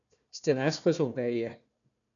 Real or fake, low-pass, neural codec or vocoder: fake; 7.2 kHz; codec, 16 kHz, 1 kbps, FunCodec, trained on Chinese and English, 50 frames a second